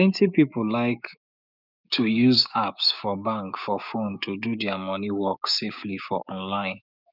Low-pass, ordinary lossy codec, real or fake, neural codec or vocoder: 5.4 kHz; none; fake; codec, 16 kHz, 6 kbps, DAC